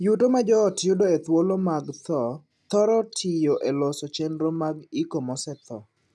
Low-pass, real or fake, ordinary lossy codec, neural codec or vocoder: none; real; none; none